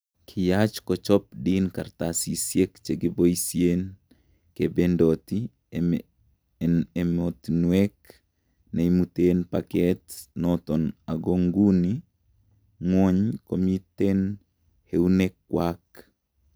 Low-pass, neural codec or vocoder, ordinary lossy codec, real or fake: none; none; none; real